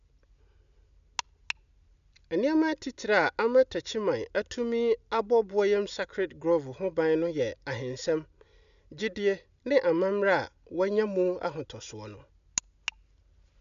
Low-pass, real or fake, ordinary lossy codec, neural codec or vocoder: 7.2 kHz; real; none; none